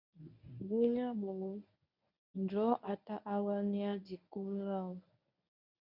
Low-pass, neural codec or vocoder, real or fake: 5.4 kHz; codec, 24 kHz, 0.9 kbps, WavTokenizer, medium speech release version 1; fake